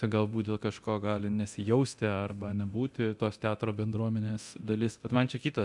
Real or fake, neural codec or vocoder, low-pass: fake; codec, 24 kHz, 0.9 kbps, DualCodec; 10.8 kHz